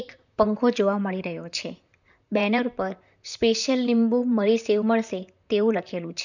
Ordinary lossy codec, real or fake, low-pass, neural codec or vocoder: none; fake; 7.2 kHz; vocoder, 44.1 kHz, 128 mel bands, Pupu-Vocoder